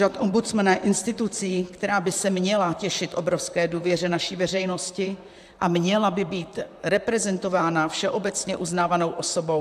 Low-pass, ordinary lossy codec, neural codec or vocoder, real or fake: 14.4 kHz; AAC, 96 kbps; vocoder, 44.1 kHz, 128 mel bands, Pupu-Vocoder; fake